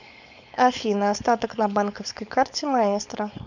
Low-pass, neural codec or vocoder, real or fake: 7.2 kHz; codec, 16 kHz, 4.8 kbps, FACodec; fake